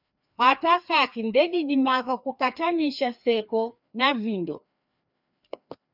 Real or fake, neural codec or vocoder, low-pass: fake; codec, 16 kHz, 2 kbps, FreqCodec, larger model; 5.4 kHz